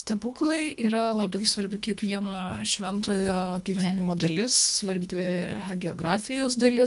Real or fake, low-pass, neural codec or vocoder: fake; 10.8 kHz; codec, 24 kHz, 1.5 kbps, HILCodec